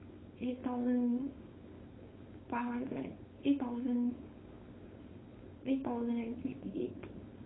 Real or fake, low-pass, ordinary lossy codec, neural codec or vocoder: fake; 7.2 kHz; AAC, 16 kbps; codec, 16 kHz, 4.8 kbps, FACodec